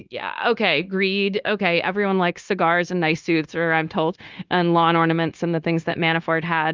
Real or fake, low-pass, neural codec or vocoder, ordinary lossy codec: fake; 7.2 kHz; codec, 16 kHz, 0.9 kbps, LongCat-Audio-Codec; Opus, 24 kbps